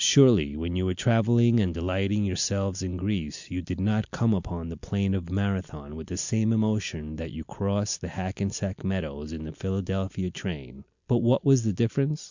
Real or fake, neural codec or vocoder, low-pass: real; none; 7.2 kHz